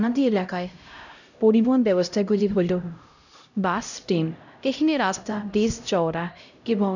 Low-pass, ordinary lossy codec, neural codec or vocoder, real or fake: 7.2 kHz; none; codec, 16 kHz, 0.5 kbps, X-Codec, HuBERT features, trained on LibriSpeech; fake